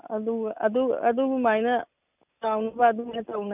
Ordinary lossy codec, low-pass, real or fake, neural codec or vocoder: none; 3.6 kHz; real; none